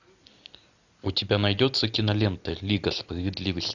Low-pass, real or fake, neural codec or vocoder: 7.2 kHz; real; none